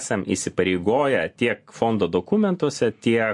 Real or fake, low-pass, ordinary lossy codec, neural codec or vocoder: real; 10.8 kHz; MP3, 48 kbps; none